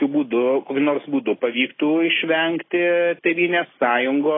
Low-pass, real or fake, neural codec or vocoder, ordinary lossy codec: 7.2 kHz; real; none; AAC, 16 kbps